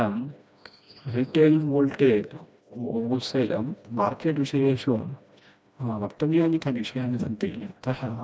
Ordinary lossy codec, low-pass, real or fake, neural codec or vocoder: none; none; fake; codec, 16 kHz, 1 kbps, FreqCodec, smaller model